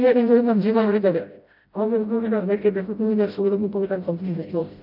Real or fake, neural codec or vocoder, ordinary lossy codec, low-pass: fake; codec, 16 kHz, 0.5 kbps, FreqCodec, smaller model; AAC, 48 kbps; 5.4 kHz